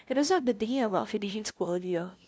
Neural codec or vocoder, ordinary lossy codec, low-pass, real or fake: codec, 16 kHz, 0.5 kbps, FunCodec, trained on LibriTTS, 25 frames a second; none; none; fake